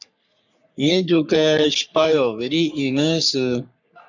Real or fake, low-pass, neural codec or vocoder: fake; 7.2 kHz; codec, 44.1 kHz, 3.4 kbps, Pupu-Codec